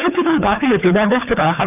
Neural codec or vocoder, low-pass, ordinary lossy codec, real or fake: codec, 24 kHz, 3 kbps, HILCodec; 3.6 kHz; none; fake